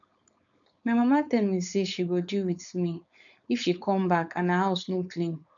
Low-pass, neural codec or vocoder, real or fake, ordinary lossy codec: 7.2 kHz; codec, 16 kHz, 4.8 kbps, FACodec; fake; none